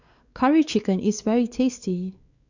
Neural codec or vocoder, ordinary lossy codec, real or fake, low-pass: codec, 16 kHz, 4 kbps, X-Codec, WavLM features, trained on Multilingual LibriSpeech; Opus, 64 kbps; fake; 7.2 kHz